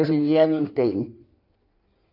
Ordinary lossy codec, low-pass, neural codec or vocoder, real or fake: none; 5.4 kHz; codec, 16 kHz in and 24 kHz out, 1.1 kbps, FireRedTTS-2 codec; fake